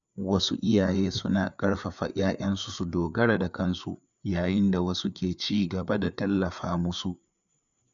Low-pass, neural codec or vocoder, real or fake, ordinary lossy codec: 7.2 kHz; codec, 16 kHz, 4 kbps, FreqCodec, larger model; fake; none